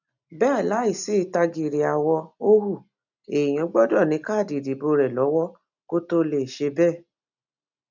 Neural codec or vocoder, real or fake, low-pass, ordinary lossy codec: none; real; 7.2 kHz; none